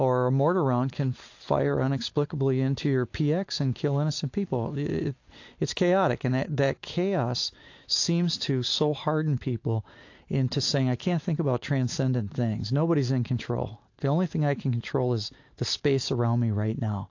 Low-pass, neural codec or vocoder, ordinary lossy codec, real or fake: 7.2 kHz; none; AAC, 48 kbps; real